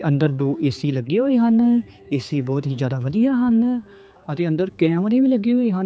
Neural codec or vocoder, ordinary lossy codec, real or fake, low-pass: codec, 16 kHz, 4 kbps, X-Codec, HuBERT features, trained on general audio; none; fake; none